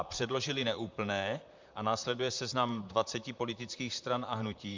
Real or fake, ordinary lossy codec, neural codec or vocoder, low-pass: fake; MP3, 64 kbps; vocoder, 44.1 kHz, 128 mel bands, Pupu-Vocoder; 7.2 kHz